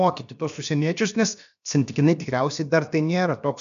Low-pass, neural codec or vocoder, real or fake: 7.2 kHz; codec, 16 kHz, about 1 kbps, DyCAST, with the encoder's durations; fake